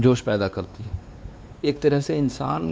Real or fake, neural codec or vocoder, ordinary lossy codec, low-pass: fake; codec, 16 kHz, 2 kbps, X-Codec, WavLM features, trained on Multilingual LibriSpeech; none; none